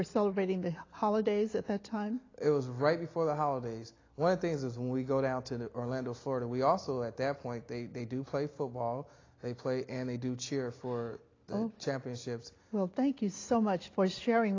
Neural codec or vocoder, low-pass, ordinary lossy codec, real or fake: none; 7.2 kHz; AAC, 32 kbps; real